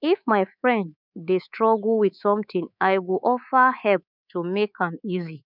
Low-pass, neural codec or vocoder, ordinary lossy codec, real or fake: 5.4 kHz; codec, 24 kHz, 3.1 kbps, DualCodec; none; fake